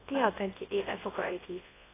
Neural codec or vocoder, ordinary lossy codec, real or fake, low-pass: codec, 24 kHz, 0.9 kbps, WavTokenizer, large speech release; AAC, 16 kbps; fake; 3.6 kHz